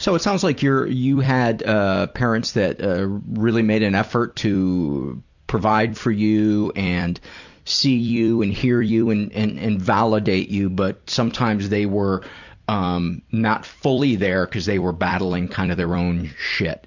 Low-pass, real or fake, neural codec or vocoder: 7.2 kHz; fake; vocoder, 44.1 kHz, 128 mel bands every 256 samples, BigVGAN v2